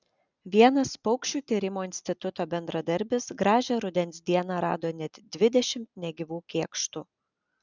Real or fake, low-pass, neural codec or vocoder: real; 7.2 kHz; none